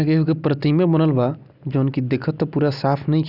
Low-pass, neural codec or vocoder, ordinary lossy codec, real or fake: 5.4 kHz; none; none; real